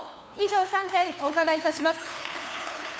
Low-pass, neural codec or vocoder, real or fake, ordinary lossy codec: none; codec, 16 kHz, 2 kbps, FunCodec, trained on LibriTTS, 25 frames a second; fake; none